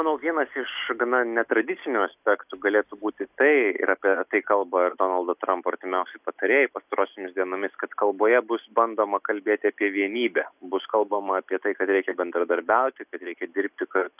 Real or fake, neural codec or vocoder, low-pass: real; none; 3.6 kHz